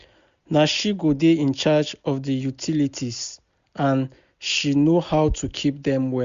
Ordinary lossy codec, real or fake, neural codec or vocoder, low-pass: Opus, 64 kbps; real; none; 7.2 kHz